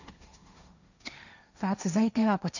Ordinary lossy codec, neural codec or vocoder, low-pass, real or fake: none; codec, 16 kHz, 1.1 kbps, Voila-Tokenizer; 7.2 kHz; fake